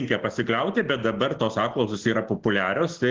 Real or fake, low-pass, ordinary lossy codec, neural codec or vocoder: real; 7.2 kHz; Opus, 16 kbps; none